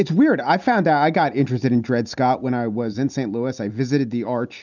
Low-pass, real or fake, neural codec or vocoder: 7.2 kHz; real; none